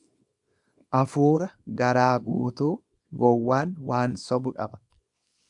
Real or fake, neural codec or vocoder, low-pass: fake; codec, 24 kHz, 0.9 kbps, WavTokenizer, small release; 10.8 kHz